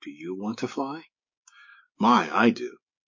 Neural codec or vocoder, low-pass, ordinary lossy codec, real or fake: codec, 16 kHz, 6 kbps, DAC; 7.2 kHz; MP3, 32 kbps; fake